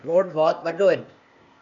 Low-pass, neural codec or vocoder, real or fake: 7.2 kHz; codec, 16 kHz, 0.8 kbps, ZipCodec; fake